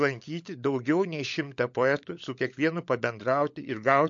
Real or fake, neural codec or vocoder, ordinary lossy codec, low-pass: fake; codec, 16 kHz, 16 kbps, FunCodec, trained on LibriTTS, 50 frames a second; MP3, 48 kbps; 7.2 kHz